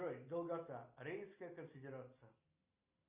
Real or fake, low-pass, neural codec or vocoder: real; 3.6 kHz; none